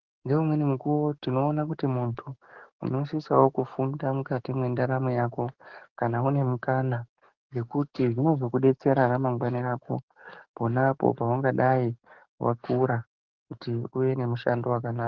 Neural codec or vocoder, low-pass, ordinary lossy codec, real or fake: codec, 44.1 kHz, 7.8 kbps, DAC; 7.2 kHz; Opus, 16 kbps; fake